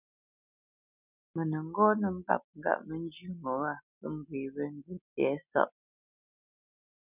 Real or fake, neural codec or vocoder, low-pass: real; none; 3.6 kHz